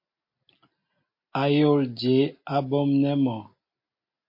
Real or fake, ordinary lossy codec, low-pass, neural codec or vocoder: real; MP3, 32 kbps; 5.4 kHz; none